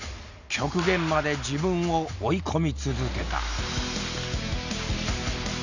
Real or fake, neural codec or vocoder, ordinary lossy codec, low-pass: real; none; none; 7.2 kHz